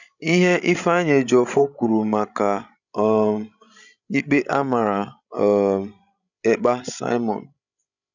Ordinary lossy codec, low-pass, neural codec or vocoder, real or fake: none; 7.2 kHz; codec, 16 kHz, 16 kbps, FreqCodec, larger model; fake